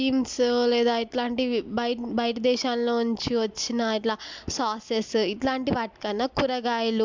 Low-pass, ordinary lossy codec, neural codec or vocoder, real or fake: 7.2 kHz; MP3, 64 kbps; none; real